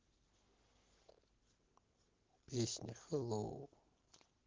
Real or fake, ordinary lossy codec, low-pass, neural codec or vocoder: real; Opus, 16 kbps; 7.2 kHz; none